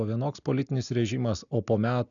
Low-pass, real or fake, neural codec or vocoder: 7.2 kHz; real; none